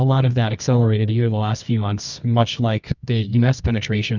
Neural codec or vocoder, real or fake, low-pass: codec, 24 kHz, 0.9 kbps, WavTokenizer, medium music audio release; fake; 7.2 kHz